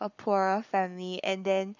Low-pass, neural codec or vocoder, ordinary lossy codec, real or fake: 7.2 kHz; codec, 44.1 kHz, 7.8 kbps, Pupu-Codec; none; fake